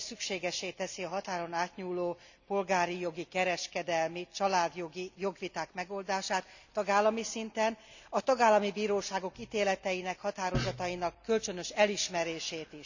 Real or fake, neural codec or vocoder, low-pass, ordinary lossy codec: real; none; 7.2 kHz; none